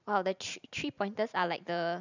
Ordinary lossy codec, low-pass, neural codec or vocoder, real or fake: none; 7.2 kHz; vocoder, 44.1 kHz, 128 mel bands every 512 samples, BigVGAN v2; fake